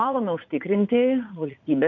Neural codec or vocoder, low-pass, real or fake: vocoder, 44.1 kHz, 80 mel bands, Vocos; 7.2 kHz; fake